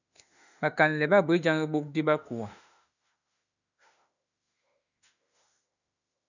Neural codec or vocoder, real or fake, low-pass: autoencoder, 48 kHz, 32 numbers a frame, DAC-VAE, trained on Japanese speech; fake; 7.2 kHz